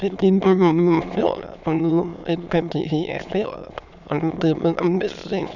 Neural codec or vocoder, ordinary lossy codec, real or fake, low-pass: autoencoder, 22.05 kHz, a latent of 192 numbers a frame, VITS, trained on many speakers; none; fake; 7.2 kHz